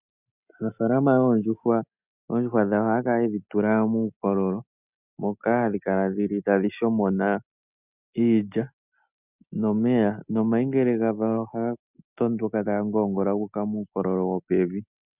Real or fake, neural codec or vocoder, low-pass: real; none; 3.6 kHz